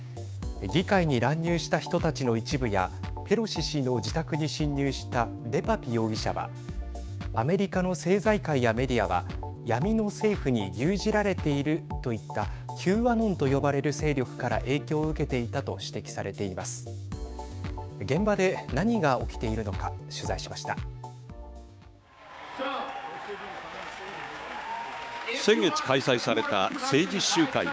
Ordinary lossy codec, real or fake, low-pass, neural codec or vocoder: none; fake; none; codec, 16 kHz, 6 kbps, DAC